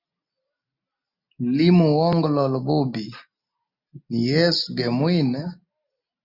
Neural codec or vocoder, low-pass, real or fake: none; 5.4 kHz; real